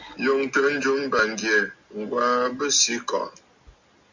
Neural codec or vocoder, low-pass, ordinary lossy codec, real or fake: none; 7.2 kHz; MP3, 48 kbps; real